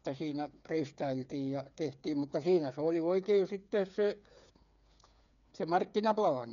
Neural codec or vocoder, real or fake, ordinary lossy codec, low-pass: codec, 16 kHz, 8 kbps, FreqCodec, smaller model; fake; none; 7.2 kHz